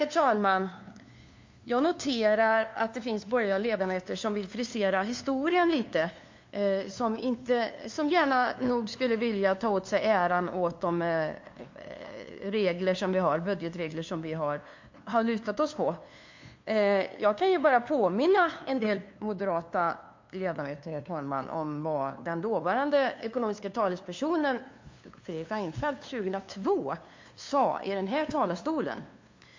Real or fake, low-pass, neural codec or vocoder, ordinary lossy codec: fake; 7.2 kHz; codec, 16 kHz, 2 kbps, FunCodec, trained on LibriTTS, 25 frames a second; MP3, 48 kbps